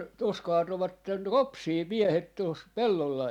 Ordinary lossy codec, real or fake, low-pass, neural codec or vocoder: none; real; 19.8 kHz; none